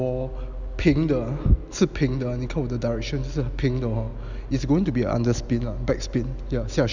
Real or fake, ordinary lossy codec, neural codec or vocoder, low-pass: real; none; none; 7.2 kHz